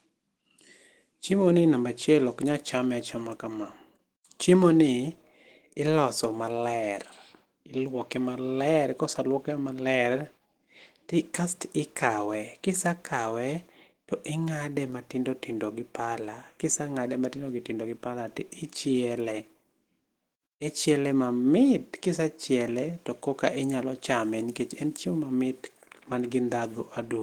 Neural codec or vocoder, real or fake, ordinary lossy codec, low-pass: autoencoder, 48 kHz, 128 numbers a frame, DAC-VAE, trained on Japanese speech; fake; Opus, 16 kbps; 19.8 kHz